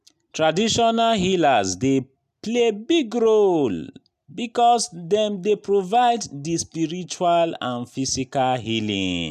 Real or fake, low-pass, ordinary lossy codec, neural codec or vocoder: real; 14.4 kHz; none; none